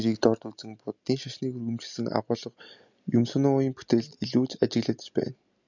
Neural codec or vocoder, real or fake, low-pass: none; real; 7.2 kHz